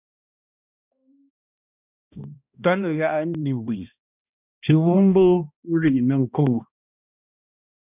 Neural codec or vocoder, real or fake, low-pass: codec, 16 kHz, 1 kbps, X-Codec, HuBERT features, trained on balanced general audio; fake; 3.6 kHz